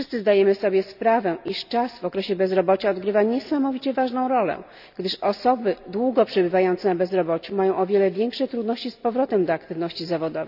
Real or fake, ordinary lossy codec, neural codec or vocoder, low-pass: real; none; none; 5.4 kHz